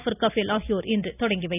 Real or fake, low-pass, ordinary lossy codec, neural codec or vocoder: real; 3.6 kHz; none; none